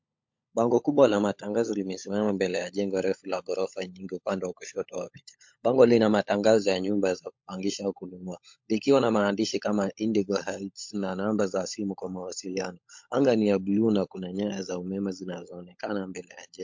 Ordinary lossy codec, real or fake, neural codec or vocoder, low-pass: MP3, 48 kbps; fake; codec, 16 kHz, 16 kbps, FunCodec, trained on LibriTTS, 50 frames a second; 7.2 kHz